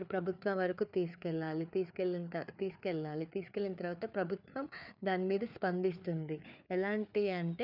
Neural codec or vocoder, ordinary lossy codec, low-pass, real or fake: codec, 16 kHz, 4 kbps, FreqCodec, larger model; none; 5.4 kHz; fake